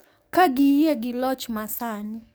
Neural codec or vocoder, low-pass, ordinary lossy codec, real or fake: codec, 44.1 kHz, 7.8 kbps, DAC; none; none; fake